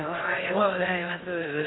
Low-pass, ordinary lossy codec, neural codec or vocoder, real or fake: 7.2 kHz; AAC, 16 kbps; codec, 16 kHz in and 24 kHz out, 0.6 kbps, FocalCodec, streaming, 2048 codes; fake